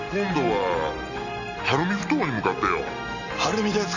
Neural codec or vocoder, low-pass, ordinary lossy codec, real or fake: none; 7.2 kHz; none; real